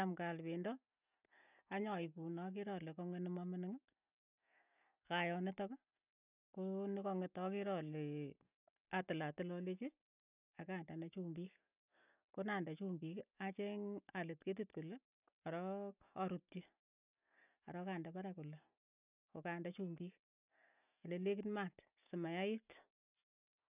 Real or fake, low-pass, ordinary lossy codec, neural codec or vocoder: real; 3.6 kHz; none; none